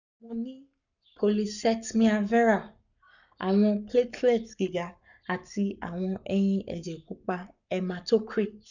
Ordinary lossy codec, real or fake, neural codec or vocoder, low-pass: none; fake; codec, 44.1 kHz, 7.8 kbps, Pupu-Codec; 7.2 kHz